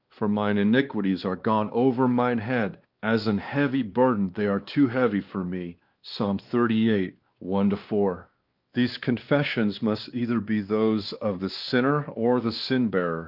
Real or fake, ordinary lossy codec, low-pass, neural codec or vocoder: fake; Opus, 32 kbps; 5.4 kHz; codec, 16 kHz, 2 kbps, X-Codec, WavLM features, trained on Multilingual LibriSpeech